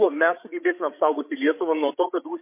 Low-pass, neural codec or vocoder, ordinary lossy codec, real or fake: 3.6 kHz; codec, 16 kHz, 16 kbps, FreqCodec, larger model; MP3, 24 kbps; fake